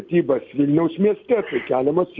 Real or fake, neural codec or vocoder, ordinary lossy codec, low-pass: real; none; MP3, 64 kbps; 7.2 kHz